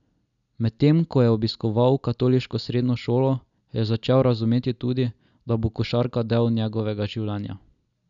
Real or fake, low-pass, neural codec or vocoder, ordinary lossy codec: real; 7.2 kHz; none; none